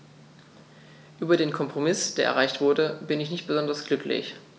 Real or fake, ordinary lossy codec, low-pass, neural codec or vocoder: real; none; none; none